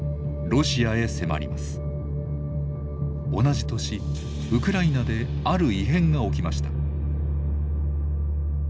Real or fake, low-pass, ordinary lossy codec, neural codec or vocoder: real; none; none; none